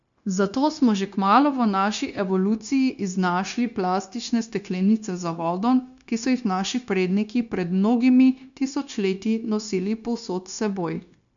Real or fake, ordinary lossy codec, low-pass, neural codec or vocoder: fake; none; 7.2 kHz; codec, 16 kHz, 0.9 kbps, LongCat-Audio-Codec